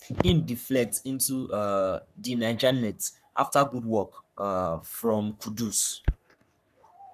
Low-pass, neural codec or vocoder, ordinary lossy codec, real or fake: 14.4 kHz; codec, 44.1 kHz, 3.4 kbps, Pupu-Codec; none; fake